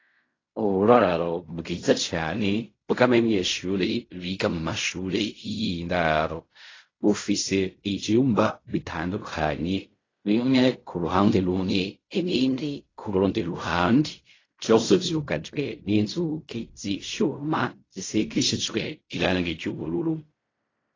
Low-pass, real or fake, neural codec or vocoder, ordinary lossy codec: 7.2 kHz; fake; codec, 16 kHz in and 24 kHz out, 0.4 kbps, LongCat-Audio-Codec, fine tuned four codebook decoder; AAC, 32 kbps